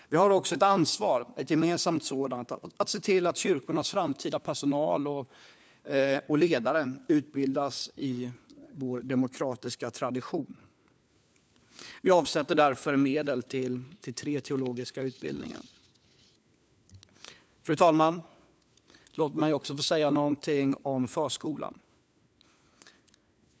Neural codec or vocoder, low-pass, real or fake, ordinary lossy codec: codec, 16 kHz, 4 kbps, FunCodec, trained on LibriTTS, 50 frames a second; none; fake; none